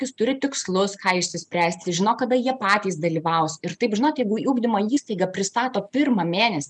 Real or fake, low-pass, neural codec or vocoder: real; 10.8 kHz; none